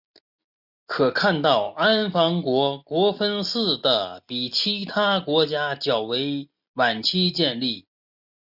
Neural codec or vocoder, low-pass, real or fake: none; 5.4 kHz; real